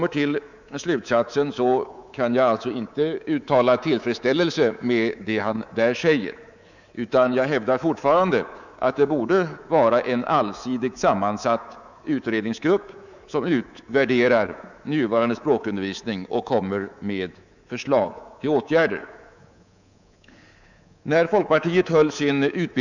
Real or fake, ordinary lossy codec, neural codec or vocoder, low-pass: fake; none; codec, 24 kHz, 3.1 kbps, DualCodec; 7.2 kHz